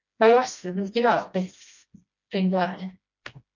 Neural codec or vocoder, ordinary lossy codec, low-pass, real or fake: codec, 16 kHz, 1 kbps, FreqCodec, smaller model; MP3, 64 kbps; 7.2 kHz; fake